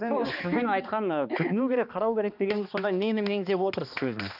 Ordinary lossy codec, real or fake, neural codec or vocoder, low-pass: none; fake; codec, 16 kHz, 2 kbps, X-Codec, HuBERT features, trained on balanced general audio; 5.4 kHz